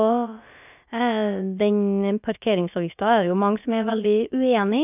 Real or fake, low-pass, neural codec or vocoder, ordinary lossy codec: fake; 3.6 kHz; codec, 16 kHz, about 1 kbps, DyCAST, with the encoder's durations; none